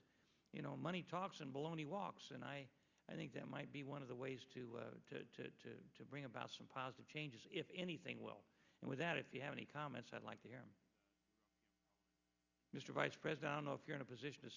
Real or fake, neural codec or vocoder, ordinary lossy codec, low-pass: real; none; Opus, 64 kbps; 7.2 kHz